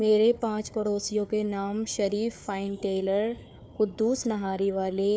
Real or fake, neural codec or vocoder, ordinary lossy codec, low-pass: fake; codec, 16 kHz, 4 kbps, FunCodec, trained on Chinese and English, 50 frames a second; none; none